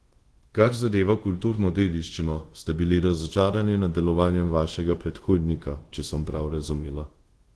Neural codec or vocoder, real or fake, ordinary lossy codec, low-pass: codec, 24 kHz, 0.5 kbps, DualCodec; fake; Opus, 16 kbps; 10.8 kHz